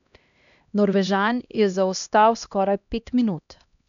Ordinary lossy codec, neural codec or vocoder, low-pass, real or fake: none; codec, 16 kHz, 1 kbps, X-Codec, HuBERT features, trained on LibriSpeech; 7.2 kHz; fake